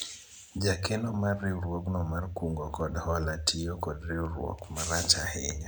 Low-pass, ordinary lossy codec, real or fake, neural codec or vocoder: none; none; real; none